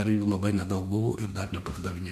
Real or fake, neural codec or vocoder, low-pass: fake; autoencoder, 48 kHz, 32 numbers a frame, DAC-VAE, trained on Japanese speech; 14.4 kHz